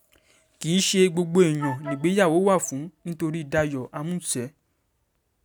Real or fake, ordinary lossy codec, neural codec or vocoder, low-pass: real; none; none; none